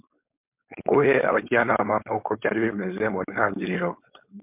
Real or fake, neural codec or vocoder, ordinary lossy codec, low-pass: fake; codec, 16 kHz, 4.8 kbps, FACodec; MP3, 32 kbps; 3.6 kHz